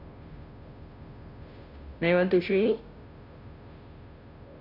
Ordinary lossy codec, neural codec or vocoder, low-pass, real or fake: none; codec, 16 kHz, 0.5 kbps, FunCodec, trained on Chinese and English, 25 frames a second; 5.4 kHz; fake